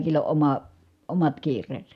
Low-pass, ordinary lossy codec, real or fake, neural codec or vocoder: 14.4 kHz; none; real; none